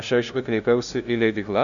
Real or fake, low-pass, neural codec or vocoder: fake; 7.2 kHz; codec, 16 kHz, 0.5 kbps, FunCodec, trained on LibriTTS, 25 frames a second